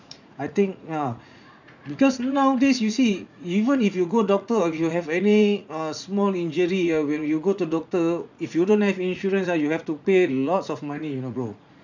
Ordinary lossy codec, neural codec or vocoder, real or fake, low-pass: none; vocoder, 44.1 kHz, 80 mel bands, Vocos; fake; 7.2 kHz